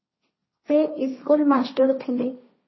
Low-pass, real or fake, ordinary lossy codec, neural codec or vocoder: 7.2 kHz; fake; MP3, 24 kbps; codec, 16 kHz, 1.1 kbps, Voila-Tokenizer